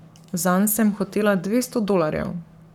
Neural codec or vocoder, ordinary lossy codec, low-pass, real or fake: codec, 44.1 kHz, 7.8 kbps, Pupu-Codec; none; 19.8 kHz; fake